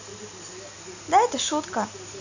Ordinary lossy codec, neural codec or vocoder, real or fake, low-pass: none; none; real; 7.2 kHz